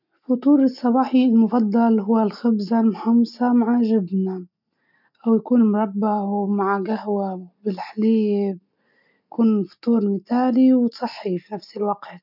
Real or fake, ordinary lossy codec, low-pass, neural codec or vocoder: real; none; 5.4 kHz; none